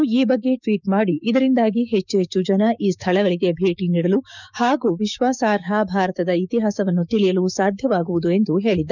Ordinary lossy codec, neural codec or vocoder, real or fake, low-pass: none; codec, 16 kHz, 6 kbps, DAC; fake; 7.2 kHz